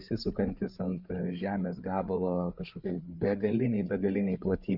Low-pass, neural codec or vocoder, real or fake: 5.4 kHz; codec, 16 kHz, 8 kbps, FreqCodec, larger model; fake